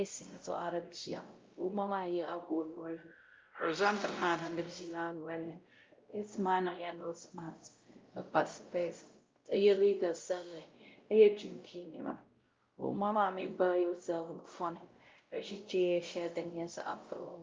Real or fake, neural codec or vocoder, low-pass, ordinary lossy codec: fake; codec, 16 kHz, 0.5 kbps, X-Codec, WavLM features, trained on Multilingual LibriSpeech; 7.2 kHz; Opus, 24 kbps